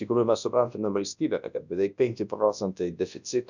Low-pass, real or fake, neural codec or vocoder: 7.2 kHz; fake; codec, 24 kHz, 0.9 kbps, WavTokenizer, large speech release